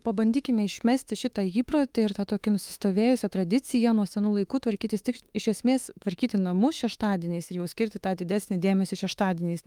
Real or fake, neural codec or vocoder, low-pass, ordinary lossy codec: fake; autoencoder, 48 kHz, 32 numbers a frame, DAC-VAE, trained on Japanese speech; 19.8 kHz; Opus, 32 kbps